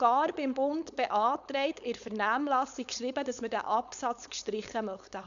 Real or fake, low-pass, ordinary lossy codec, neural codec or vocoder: fake; 7.2 kHz; none; codec, 16 kHz, 4.8 kbps, FACodec